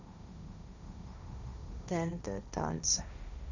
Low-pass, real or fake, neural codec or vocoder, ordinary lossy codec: 7.2 kHz; fake; codec, 16 kHz, 1.1 kbps, Voila-Tokenizer; none